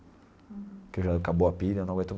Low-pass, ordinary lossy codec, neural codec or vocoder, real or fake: none; none; none; real